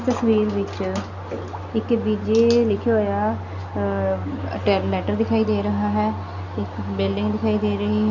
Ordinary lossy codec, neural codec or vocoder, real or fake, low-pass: none; none; real; 7.2 kHz